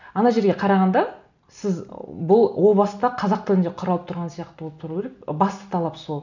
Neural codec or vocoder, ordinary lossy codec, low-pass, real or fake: none; none; 7.2 kHz; real